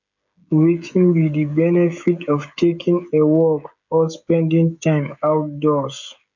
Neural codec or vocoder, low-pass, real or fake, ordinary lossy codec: codec, 16 kHz, 16 kbps, FreqCodec, smaller model; 7.2 kHz; fake; none